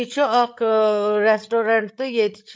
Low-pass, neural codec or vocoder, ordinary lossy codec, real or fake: none; codec, 16 kHz, 8 kbps, FreqCodec, larger model; none; fake